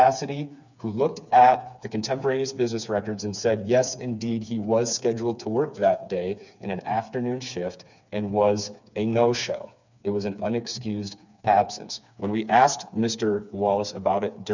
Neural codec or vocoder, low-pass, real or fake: codec, 16 kHz, 4 kbps, FreqCodec, smaller model; 7.2 kHz; fake